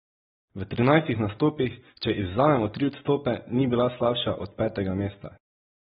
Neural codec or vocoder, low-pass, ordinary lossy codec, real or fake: none; 7.2 kHz; AAC, 16 kbps; real